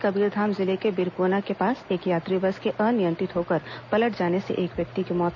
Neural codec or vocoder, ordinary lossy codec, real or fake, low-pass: none; none; real; 7.2 kHz